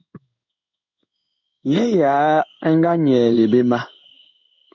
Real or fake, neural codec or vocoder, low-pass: fake; codec, 16 kHz in and 24 kHz out, 1 kbps, XY-Tokenizer; 7.2 kHz